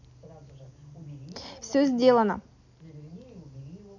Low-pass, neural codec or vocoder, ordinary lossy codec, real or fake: 7.2 kHz; none; none; real